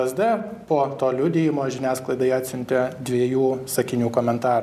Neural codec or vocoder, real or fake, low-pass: none; real; 14.4 kHz